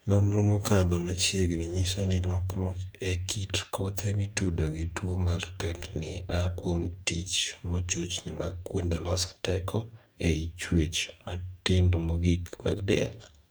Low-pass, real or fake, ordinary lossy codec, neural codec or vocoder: none; fake; none; codec, 44.1 kHz, 2.6 kbps, DAC